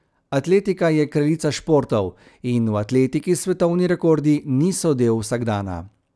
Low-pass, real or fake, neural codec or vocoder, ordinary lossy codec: none; real; none; none